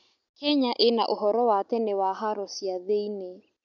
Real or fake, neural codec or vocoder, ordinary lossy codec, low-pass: real; none; none; 7.2 kHz